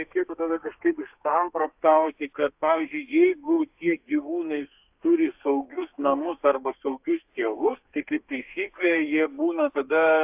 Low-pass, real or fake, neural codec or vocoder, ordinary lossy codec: 3.6 kHz; fake; codec, 32 kHz, 1.9 kbps, SNAC; AAC, 32 kbps